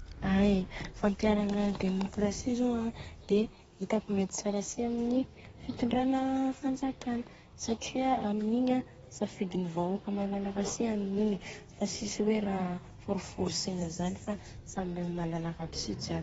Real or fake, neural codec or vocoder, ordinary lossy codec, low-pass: fake; codec, 32 kHz, 1.9 kbps, SNAC; AAC, 24 kbps; 14.4 kHz